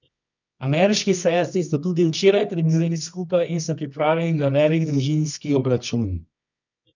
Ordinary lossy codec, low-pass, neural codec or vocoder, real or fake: none; 7.2 kHz; codec, 24 kHz, 0.9 kbps, WavTokenizer, medium music audio release; fake